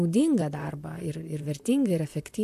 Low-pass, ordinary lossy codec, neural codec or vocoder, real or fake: 14.4 kHz; AAC, 96 kbps; vocoder, 44.1 kHz, 128 mel bands, Pupu-Vocoder; fake